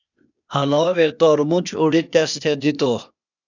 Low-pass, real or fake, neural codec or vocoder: 7.2 kHz; fake; codec, 16 kHz, 0.8 kbps, ZipCodec